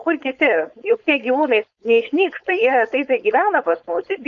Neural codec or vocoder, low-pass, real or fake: codec, 16 kHz, 4.8 kbps, FACodec; 7.2 kHz; fake